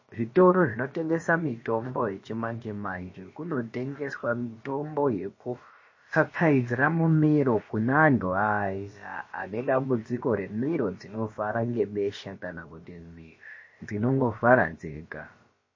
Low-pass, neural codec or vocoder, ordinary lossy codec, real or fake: 7.2 kHz; codec, 16 kHz, about 1 kbps, DyCAST, with the encoder's durations; MP3, 32 kbps; fake